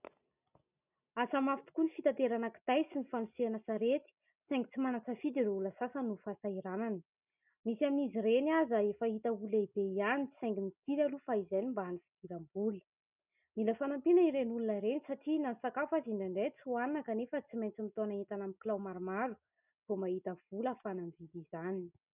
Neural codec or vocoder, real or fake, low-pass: none; real; 3.6 kHz